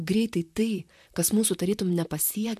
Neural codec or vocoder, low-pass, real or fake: vocoder, 44.1 kHz, 128 mel bands, Pupu-Vocoder; 14.4 kHz; fake